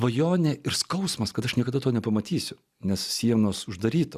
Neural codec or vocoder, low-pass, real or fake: none; 14.4 kHz; real